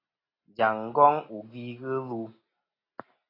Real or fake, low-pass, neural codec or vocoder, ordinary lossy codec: real; 5.4 kHz; none; AAC, 24 kbps